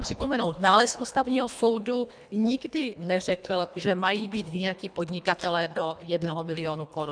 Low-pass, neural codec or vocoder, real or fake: 9.9 kHz; codec, 24 kHz, 1.5 kbps, HILCodec; fake